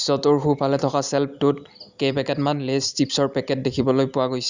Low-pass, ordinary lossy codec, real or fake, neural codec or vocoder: 7.2 kHz; Opus, 64 kbps; real; none